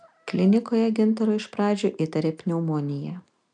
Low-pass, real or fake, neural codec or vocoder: 9.9 kHz; real; none